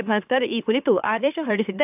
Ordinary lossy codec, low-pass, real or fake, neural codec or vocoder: AAC, 24 kbps; 3.6 kHz; fake; autoencoder, 44.1 kHz, a latent of 192 numbers a frame, MeloTTS